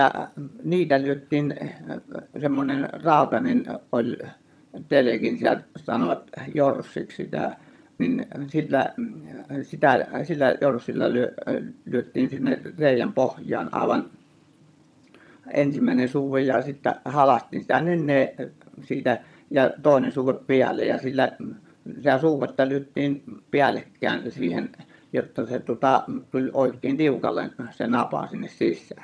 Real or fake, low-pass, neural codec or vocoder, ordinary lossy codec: fake; none; vocoder, 22.05 kHz, 80 mel bands, HiFi-GAN; none